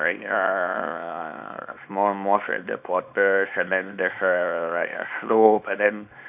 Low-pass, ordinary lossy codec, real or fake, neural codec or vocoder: 3.6 kHz; none; fake; codec, 24 kHz, 0.9 kbps, WavTokenizer, small release